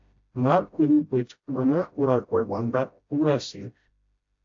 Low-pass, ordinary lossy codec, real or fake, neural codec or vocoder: 7.2 kHz; AAC, 48 kbps; fake; codec, 16 kHz, 0.5 kbps, FreqCodec, smaller model